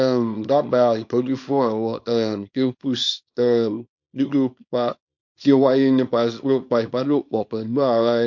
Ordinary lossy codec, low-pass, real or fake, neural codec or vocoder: MP3, 48 kbps; 7.2 kHz; fake; codec, 24 kHz, 0.9 kbps, WavTokenizer, small release